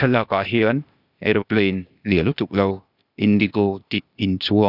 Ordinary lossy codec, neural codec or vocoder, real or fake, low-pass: none; codec, 16 kHz, 0.8 kbps, ZipCodec; fake; 5.4 kHz